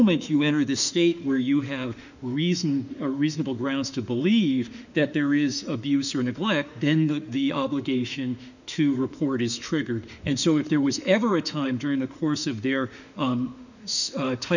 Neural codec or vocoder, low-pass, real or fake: autoencoder, 48 kHz, 32 numbers a frame, DAC-VAE, trained on Japanese speech; 7.2 kHz; fake